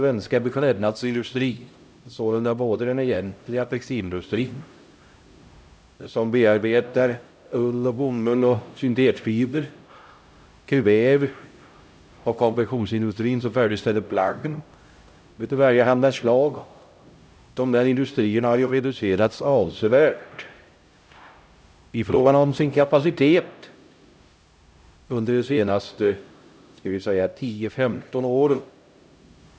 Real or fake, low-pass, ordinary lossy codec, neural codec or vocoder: fake; none; none; codec, 16 kHz, 0.5 kbps, X-Codec, HuBERT features, trained on LibriSpeech